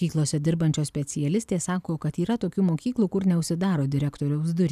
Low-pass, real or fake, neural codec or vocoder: 14.4 kHz; real; none